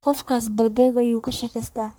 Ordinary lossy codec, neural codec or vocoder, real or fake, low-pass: none; codec, 44.1 kHz, 1.7 kbps, Pupu-Codec; fake; none